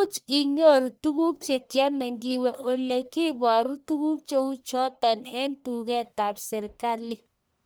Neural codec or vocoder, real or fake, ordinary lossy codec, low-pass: codec, 44.1 kHz, 1.7 kbps, Pupu-Codec; fake; none; none